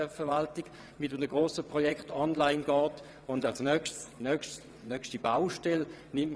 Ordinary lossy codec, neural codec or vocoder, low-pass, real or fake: none; vocoder, 22.05 kHz, 80 mel bands, WaveNeXt; none; fake